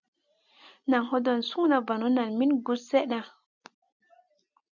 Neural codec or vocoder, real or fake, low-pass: none; real; 7.2 kHz